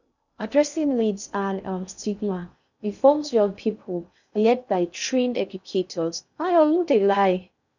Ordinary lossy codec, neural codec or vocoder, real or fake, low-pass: none; codec, 16 kHz in and 24 kHz out, 0.6 kbps, FocalCodec, streaming, 2048 codes; fake; 7.2 kHz